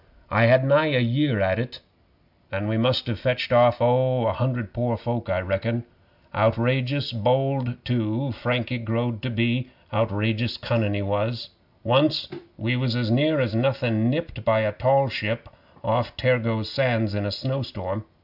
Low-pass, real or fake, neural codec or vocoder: 5.4 kHz; real; none